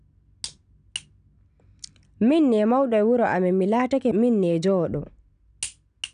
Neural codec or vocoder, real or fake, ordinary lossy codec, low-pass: none; real; none; 9.9 kHz